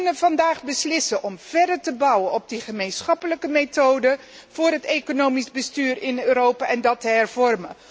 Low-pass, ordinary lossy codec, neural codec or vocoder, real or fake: none; none; none; real